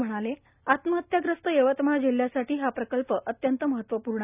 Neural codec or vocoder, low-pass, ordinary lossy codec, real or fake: none; 3.6 kHz; none; real